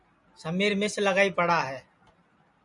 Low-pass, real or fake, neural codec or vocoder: 10.8 kHz; real; none